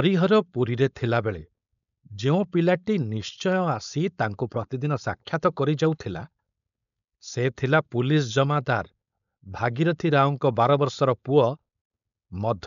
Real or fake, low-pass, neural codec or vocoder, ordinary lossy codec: fake; 7.2 kHz; codec, 16 kHz, 4.8 kbps, FACodec; none